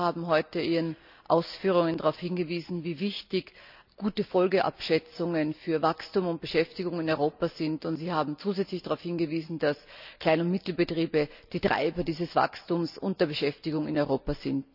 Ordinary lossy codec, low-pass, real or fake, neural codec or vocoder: none; 5.4 kHz; real; none